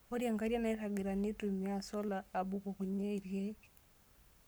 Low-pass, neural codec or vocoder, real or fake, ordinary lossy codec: none; codec, 44.1 kHz, 7.8 kbps, Pupu-Codec; fake; none